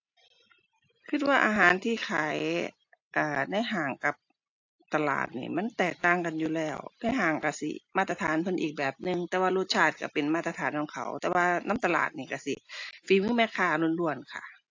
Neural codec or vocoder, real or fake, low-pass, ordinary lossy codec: none; real; 7.2 kHz; AAC, 48 kbps